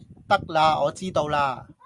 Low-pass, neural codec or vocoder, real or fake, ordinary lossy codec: 10.8 kHz; none; real; Opus, 64 kbps